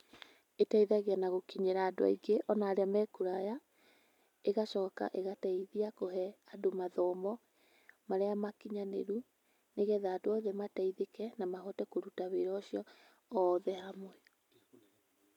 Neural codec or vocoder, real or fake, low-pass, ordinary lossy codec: none; real; 19.8 kHz; none